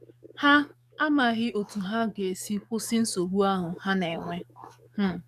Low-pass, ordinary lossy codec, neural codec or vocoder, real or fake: 14.4 kHz; none; codec, 44.1 kHz, 7.8 kbps, DAC; fake